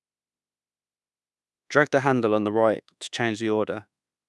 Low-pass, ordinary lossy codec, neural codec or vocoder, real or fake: none; none; codec, 24 kHz, 1.2 kbps, DualCodec; fake